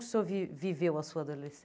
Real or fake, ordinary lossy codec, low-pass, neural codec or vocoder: real; none; none; none